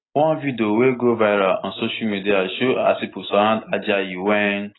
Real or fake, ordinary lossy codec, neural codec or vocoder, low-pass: real; AAC, 16 kbps; none; 7.2 kHz